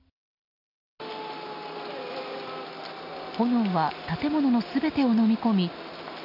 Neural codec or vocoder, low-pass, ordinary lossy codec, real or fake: none; 5.4 kHz; none; real